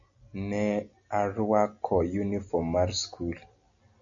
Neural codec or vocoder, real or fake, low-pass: none; real; 7.2 kHz